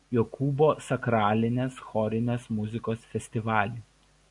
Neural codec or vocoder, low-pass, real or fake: none; 10.8 kHz; real